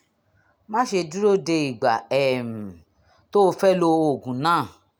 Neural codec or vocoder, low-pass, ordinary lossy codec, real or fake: none; 19.8 kHz; none; real